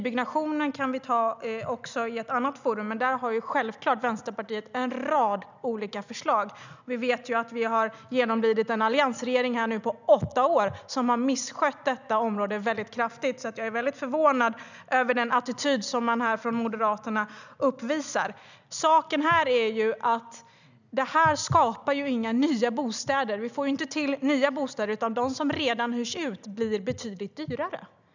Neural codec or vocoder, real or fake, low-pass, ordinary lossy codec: none; real; 7.2 kHz; none